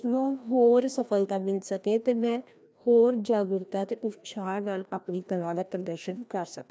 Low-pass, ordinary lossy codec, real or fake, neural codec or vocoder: none; none; fake; codec, 16 kHz, 1 kbps, FreqCodec, larger model